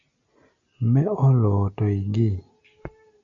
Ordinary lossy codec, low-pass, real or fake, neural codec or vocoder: MP3, 96 kbps; 7.2 kHz; real; none